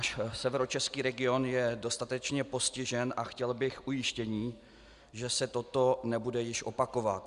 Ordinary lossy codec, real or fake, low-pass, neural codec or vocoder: Opus, 64 kbps; real; 10.8 kHz; none